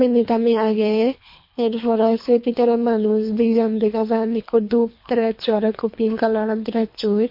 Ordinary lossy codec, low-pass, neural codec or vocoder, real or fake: MP3, 32 kbps; 5.4 kHz; codec, 24 kHz, 3 kbps, HILCodec; fake